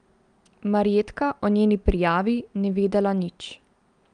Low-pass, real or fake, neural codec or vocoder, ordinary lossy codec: 9.9 kHz; real; none; Opus, 32 kbps